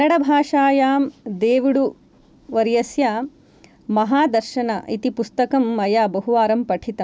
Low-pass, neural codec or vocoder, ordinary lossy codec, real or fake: none; none; none; real